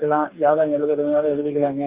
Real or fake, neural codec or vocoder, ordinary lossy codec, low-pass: fake; codec, 44.1 kHz, 2.6 kbps, DAC; Opus, 24 kbps; 3.6 kHz